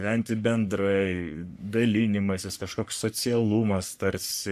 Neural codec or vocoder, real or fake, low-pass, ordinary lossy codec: codec, 44.1 kHz, 3.4 kbps, Pupu-Codec; fake; 14.4 kHz; AAC, 96 kbps